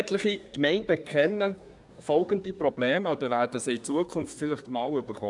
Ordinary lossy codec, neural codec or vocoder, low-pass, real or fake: none; codec, 24 kHz, 1 kbps, SNAC; 10.8 kHz; fake